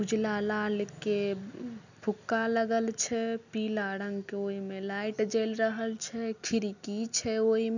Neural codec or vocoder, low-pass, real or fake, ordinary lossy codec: none; 7.2 kHz; real; none